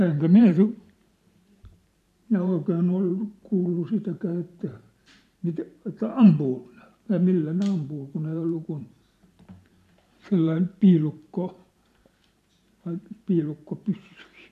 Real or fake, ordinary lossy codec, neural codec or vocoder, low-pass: fake; none; vocoder, 44.1 kHz, 128 mel bands every 512 samples, BigVGAN v2; 14.4 kHz